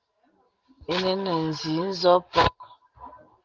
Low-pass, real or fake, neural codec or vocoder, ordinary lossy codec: 7.2 kHz; real; none; Opus, 32 kbps